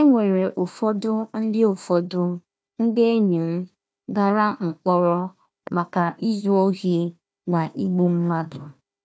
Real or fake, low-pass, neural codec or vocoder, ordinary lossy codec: fake; none; codec, 16 kHz, 1 kbps, FunCodec, trained on Chinese and English, 50 frames a second; none